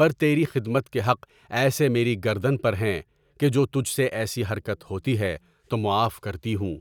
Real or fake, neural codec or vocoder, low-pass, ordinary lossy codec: real; none; 19.8 kHz; none